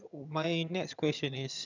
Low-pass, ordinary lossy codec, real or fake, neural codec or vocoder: 7.2 kHz; none; fake; vocoder, 22.05 kHz, 80 mel bands, HiFi-GAN